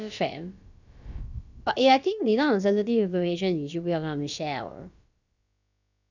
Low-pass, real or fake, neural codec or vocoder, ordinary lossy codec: 7.2 kHz; fake; codec, 16 kHz, about 1 kbps, DyCAST, with the encoder's durations; none